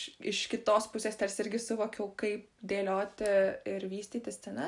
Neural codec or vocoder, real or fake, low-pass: none; real; 10.8 kHz